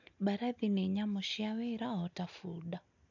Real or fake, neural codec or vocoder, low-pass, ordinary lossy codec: real; none; 7.2 kHz; none